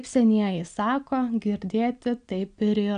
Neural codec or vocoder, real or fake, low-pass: none; real; 9.9 kHz